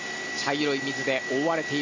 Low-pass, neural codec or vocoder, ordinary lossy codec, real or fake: 7.2 kHz; none; MP3, 32 kbps; real